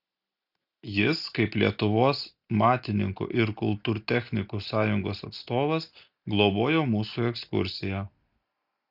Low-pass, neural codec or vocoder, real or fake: 5.4 kHz; none; real